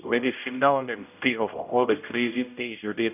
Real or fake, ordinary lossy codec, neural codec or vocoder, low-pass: fake; none; codec, 16 kHz, 0.5 kbps, X-Codec, HuBERT features, trained on general audio; 3.6 kHz